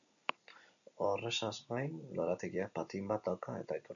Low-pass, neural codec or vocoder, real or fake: 7.2 kHz; none; real